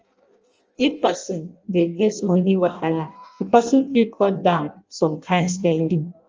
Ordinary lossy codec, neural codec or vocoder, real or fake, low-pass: Opus, 24 kbps; codec, 16 kHz in and 24 kHz out, 0.6 kbps, FireRedTTS-2 codec; fake; 7.2 kHz